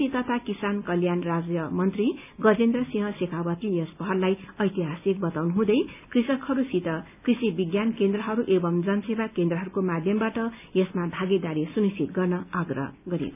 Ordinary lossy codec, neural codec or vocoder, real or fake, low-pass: none; none; real; 3.6 kHz